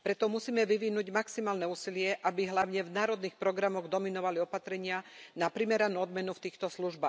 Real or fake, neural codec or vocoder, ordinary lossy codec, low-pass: real; none; none; none